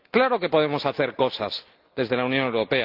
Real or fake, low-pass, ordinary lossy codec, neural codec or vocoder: real; 5.4 kHz; Opus, 32 kbps; none